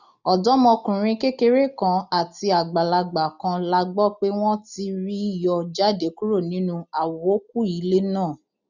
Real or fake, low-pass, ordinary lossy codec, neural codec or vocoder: real; 7.2 kHz; none; none